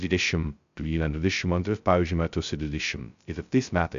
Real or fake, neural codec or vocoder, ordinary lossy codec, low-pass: fake; codec, 16 kHz, 0.2 kbps, FocalCodec; MP3, 64 kbps; 7.2 kHz